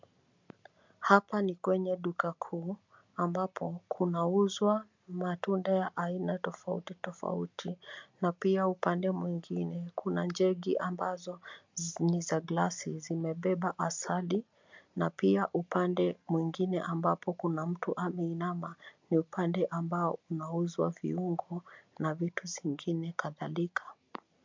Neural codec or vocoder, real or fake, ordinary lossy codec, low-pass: none; real; MP3, 64 kbps; 7.2 kHz